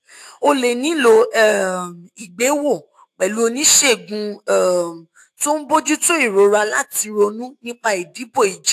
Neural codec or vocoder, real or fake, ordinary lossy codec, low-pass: autoencoder, 48 kHz, 128 numbers a frame, DAC-VAE, trained on Japanese speech; fake; AAC, 64 kbps; 14.4 kHz